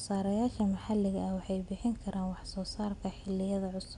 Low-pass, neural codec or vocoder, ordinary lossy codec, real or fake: 10.8 kHz; none; none; real